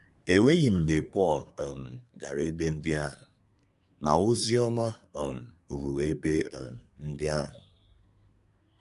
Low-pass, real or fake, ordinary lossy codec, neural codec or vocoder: 10.8 kHz; fake; none; codec, 24 kHz, 1 kbps, SNAC